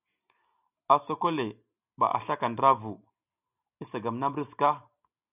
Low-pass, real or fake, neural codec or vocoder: 3.6 kHz; real; none